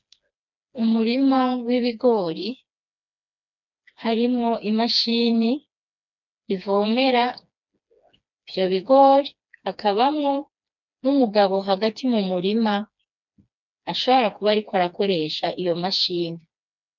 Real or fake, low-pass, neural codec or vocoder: fake; 7.2 kHz; codec, 16 kHz, 2 kbps, FreqCodec, smaller model